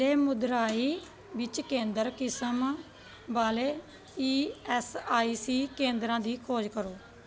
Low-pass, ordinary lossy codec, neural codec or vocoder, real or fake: none; none; none; real